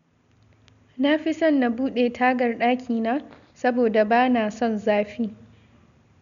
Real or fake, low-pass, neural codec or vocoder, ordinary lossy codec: real; 7.2 kHz; none; none